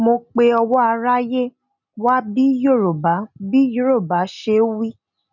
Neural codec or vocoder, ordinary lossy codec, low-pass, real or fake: none; none; 7.2 kHz; real